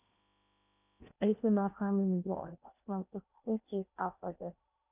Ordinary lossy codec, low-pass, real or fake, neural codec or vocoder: none; 3.6 kHz; fake; codec, 16 kHz in and 24 kHz out, 0.8 kbps, FocalCodec, streaming, 65536 codes